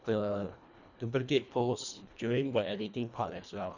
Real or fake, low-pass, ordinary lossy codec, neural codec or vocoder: fake; 7.2 kHz; none; codec, 24 kHz, 1.5 kbps, HILCodec